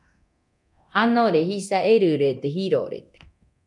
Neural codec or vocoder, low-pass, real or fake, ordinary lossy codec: codec, 24 kHz, 0.9 kbps, DualCodec; 10.8 kHz; fake; MP3, 96 kbps